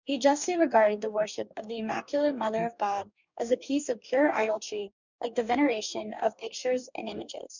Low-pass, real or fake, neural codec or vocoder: 7.2 kHz; fake; codec, 44.1 kHz, 2.6 kbps, DAC